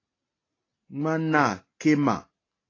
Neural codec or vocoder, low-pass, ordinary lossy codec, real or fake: none; 7.2 kHz; AAC, 32 kbps; real